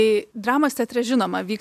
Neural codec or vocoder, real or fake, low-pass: none; real; 14.4 kHz